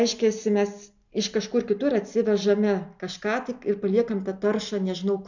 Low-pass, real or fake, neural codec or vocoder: 7.2 kHz; real; none